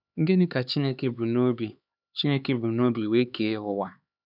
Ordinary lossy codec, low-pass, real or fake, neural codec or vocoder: none; 5.4 kHz; fake; codec, 16 kHz, 4 kbps, X-Codec, HuBERT features, trained on LibriSpeech